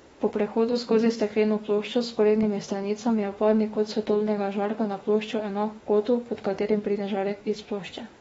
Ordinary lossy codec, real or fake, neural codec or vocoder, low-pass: AAC, 24 kbps; fake; autoencoder, 48 kHz, 32 numbers a frame, DAC-VAE, trained on Japanese speech; 19.8 kHz